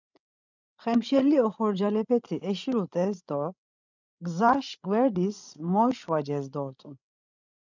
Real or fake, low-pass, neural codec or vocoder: fake; 7.2 kHz; codec, 16 kHz, 16 kbps, FreqCodec, larger model